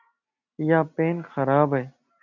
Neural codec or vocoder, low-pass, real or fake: none; 7.2 kHz; real